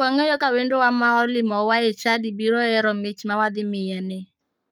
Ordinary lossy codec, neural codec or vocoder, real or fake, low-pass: none; codec, 44.1 kHz, 7.8 kbps, Pupu-Codec; fake; 19.8 kHz